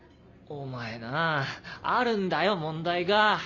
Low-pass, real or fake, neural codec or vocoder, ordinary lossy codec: 7.2 kHz; real; none; none